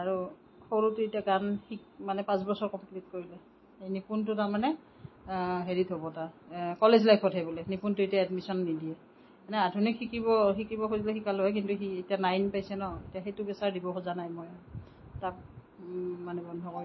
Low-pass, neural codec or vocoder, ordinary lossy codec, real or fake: 7.2 kHz; none; MP3, 24 kbps; real